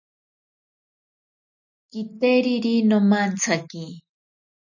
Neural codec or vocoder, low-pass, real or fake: none; 7.2 kHz; real